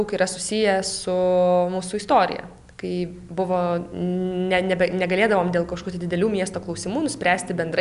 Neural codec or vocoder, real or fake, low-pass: none; real; 10.8 kHz